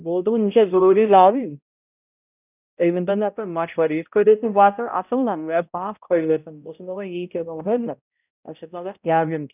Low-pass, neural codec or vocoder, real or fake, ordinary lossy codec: 3.6 kHz; codec, 16 kHz, 0.5 kbps, X-Codec, HuBERT features, trained on balanced general audio; fake; none